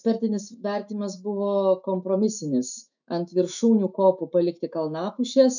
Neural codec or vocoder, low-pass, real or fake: autoencoder, 48 kHz, 128 numbers a frame, DAC-VAE, trained on Japanese speech; 7.2 kHz; fake